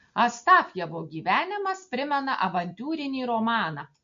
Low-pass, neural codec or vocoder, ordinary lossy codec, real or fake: 7.2 kHz; none; MP3, 48 kbps; real